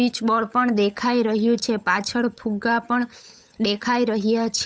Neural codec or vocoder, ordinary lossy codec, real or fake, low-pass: codec, 16 kHz, 8 kbps, FunCodec, trained on Chinese and English, 25 frames a second; none; fake; none